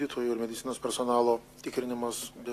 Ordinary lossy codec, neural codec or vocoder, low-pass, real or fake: AAC, 48 kbps; none; 14.4 kHz; real